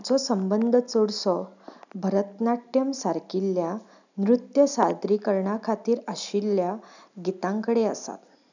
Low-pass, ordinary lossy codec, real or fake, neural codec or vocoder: 7.2 kHz; none; real; none